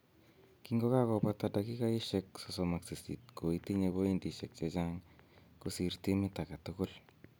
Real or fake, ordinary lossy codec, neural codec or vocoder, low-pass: real; none; none; none